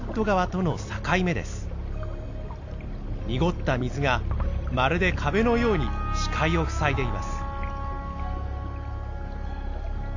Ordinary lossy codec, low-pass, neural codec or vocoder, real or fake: AAC, 48 kbps; 7.2 kHz; none; real